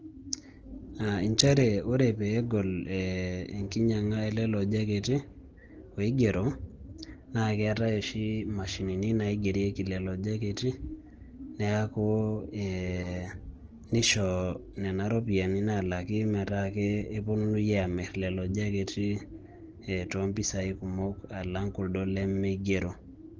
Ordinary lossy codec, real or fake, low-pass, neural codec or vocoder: Opus, 16 kbps; real; 7.2 kHz; none